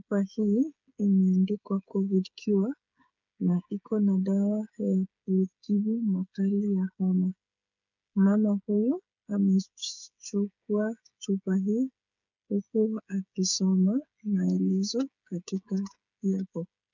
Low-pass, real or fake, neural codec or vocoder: 7.2 kHz; fake; codec, 16 kHz, 8 kbps, FreqCodec, smaller model